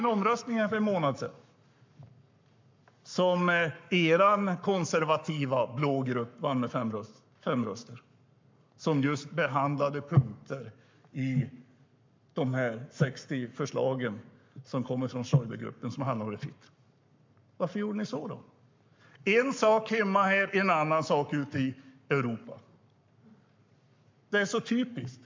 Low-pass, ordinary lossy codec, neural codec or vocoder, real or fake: 7.2 kHz; MP3, 64 kbps; codec, 44.1 kHz, 7.8 kbps, Pupu-Codec; fake